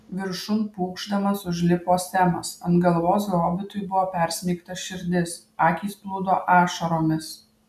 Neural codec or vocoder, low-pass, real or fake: none; 14.4 kHz; real